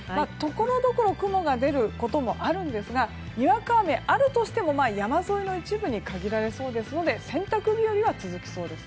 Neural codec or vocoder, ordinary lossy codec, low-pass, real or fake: none; none; none; real